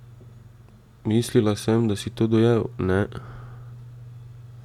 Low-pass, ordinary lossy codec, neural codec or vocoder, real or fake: 19.8 kHz; none; none; real